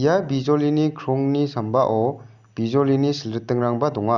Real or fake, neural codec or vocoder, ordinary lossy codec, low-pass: real; none; Opus, 64 kbps; 7.2 kHz